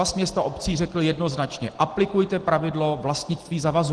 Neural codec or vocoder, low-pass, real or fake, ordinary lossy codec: none; 9.9 kHz; real; Opus, 16 kbps